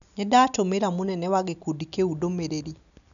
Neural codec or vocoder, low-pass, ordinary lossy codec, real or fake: none; 7.2 kHz; none; real